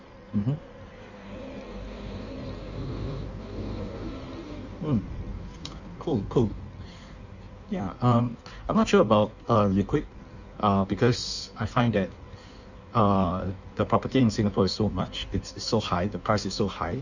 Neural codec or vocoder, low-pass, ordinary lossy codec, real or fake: codec, 16 kHz in and 24 kHz out, 1.1 kbps, FireRedTTS-2 codec; 7.2 kHz; none; fake